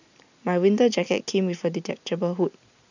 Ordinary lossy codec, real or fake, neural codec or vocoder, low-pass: none; real; none; 7.2 kHz